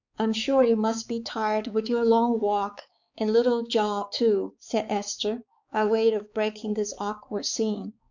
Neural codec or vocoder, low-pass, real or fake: codec, 16 kHz, 4 kbps, X-Codec, HuBERT features, trained on balanced general audio; 7.2 kHz; fake